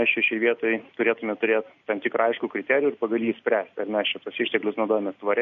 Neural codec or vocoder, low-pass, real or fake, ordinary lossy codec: none; 7.2 kHz; real; MP3, 48 kbps